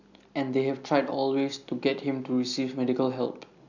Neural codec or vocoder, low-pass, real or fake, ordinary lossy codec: none; 7.2 kHz; real; none